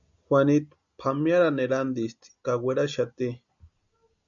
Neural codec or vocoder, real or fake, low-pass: none; real; 7.2 kHz